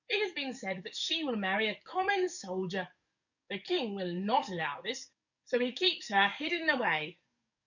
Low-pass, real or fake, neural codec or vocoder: 7.2 kHz; fake; codec, 44.1 kHz, 7.8 kbps, DAC